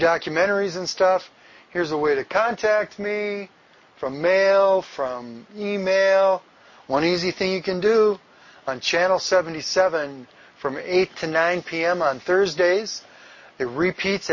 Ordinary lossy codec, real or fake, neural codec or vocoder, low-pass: MP3, 32 kbps; real; none; 7.2 kHz